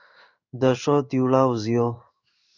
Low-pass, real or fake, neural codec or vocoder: 7.2 kHz; fake; codec, 16 kHz in and 24 kHz out, 1 kbps, XY-Tokenizer